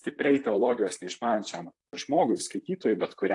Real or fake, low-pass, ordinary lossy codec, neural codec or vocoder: fake; 10.8 kHz; AAC, 48 kbps; vocoder, 44.1 kHz, 128 mel bands, Pupu-Vocoder